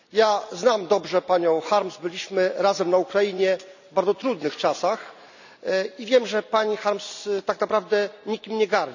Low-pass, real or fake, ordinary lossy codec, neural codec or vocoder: 7.2 kHz; real; none; none